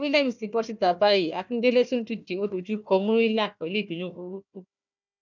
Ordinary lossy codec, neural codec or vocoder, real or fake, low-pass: none; codec, 16 kHz, 1 kbps, FunCodec, trained on Chinese and English, 50 frames a second; fake; 7.2 kHz